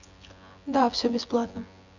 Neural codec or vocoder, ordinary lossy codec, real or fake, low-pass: vocoder, 24 kHz, 100 mel bands, Vocos; none; fake; 7.2 kHz